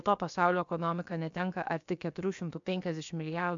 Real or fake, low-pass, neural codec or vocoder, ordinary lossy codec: fake; 7.2 kHz; codec, 16 kHz, about 1 kbps, DyCAST, with the encoder's durations; MP3, 64 kbps